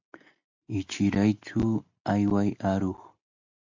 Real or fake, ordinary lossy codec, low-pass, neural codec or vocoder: real; AAC, 48 kbps; 7.2 kHz; none